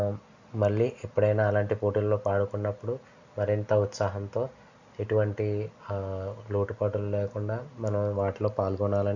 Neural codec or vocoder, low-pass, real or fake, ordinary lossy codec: none; 7.2 kHz; real; none